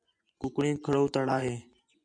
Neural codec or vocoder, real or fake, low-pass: vocoder, 24 kHz, 100 mel bands, Vocos; fake; 9.9 kHz